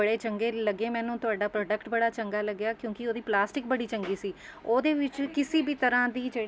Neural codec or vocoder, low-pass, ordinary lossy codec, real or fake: none; none; none; real